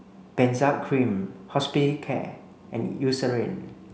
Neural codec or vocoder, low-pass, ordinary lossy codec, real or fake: none; none; none; real